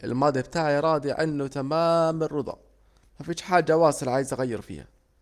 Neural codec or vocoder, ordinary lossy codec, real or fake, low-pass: none; Opus, 32 kbps; real; 14.4 kHz